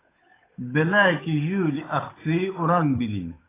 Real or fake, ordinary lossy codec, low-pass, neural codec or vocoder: fake; AAC, 16 kbps; 3.6 kHz; codec, 16 kHz, 8 kbps, FunCodec, trained on Chinese and English, 25 frames a second